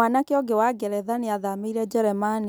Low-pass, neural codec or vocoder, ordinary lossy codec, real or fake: none; none; none; real